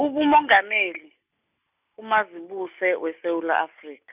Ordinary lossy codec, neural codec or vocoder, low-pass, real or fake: none; none; 3.6 kHz; real